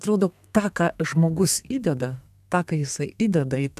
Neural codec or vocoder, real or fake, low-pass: codec, 32 kHz, 1.9 kbps, SNAC; fake; 14.4 kHz